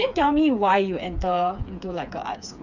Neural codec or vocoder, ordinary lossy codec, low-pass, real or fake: codec, 16 kHz, 4 kbps, FreqCodec, smaller model; none; 7.2 kHz; fake